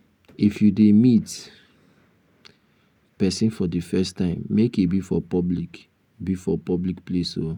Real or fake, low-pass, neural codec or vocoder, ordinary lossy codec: real; 19.8 kHz; none; none